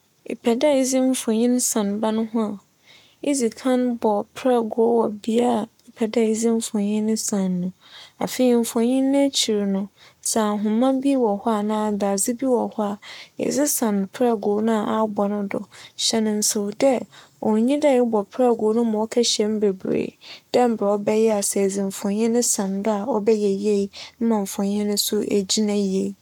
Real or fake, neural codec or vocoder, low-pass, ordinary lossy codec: fake; codec, 44.1 kHz, 7.8 kbps, Pupu-Codec; 19.8 kHz; none